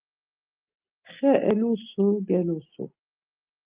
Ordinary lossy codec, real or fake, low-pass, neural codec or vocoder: Opus, 32 kbps; real; 3.6 kHz; none